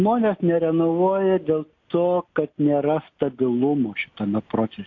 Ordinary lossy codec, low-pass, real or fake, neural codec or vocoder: AAC, 48 kbps; 7.2 kHz; real; none